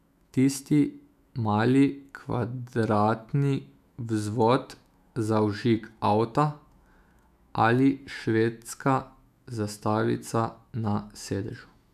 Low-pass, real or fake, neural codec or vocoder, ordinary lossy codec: 14.4 kHz; fake; autoencoder, 48 kHz, 128 numbers a frame, DAC-VAE, trained on Japanese speech; none